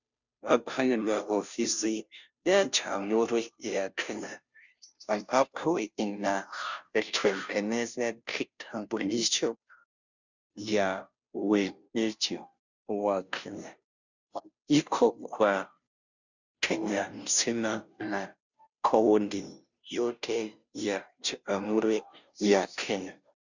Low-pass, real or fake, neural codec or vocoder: 7.2 kHz; fake; codec, 16 kHz, 0.5 kbps, FunCodec, trained on Chinese and English, 25 frames a second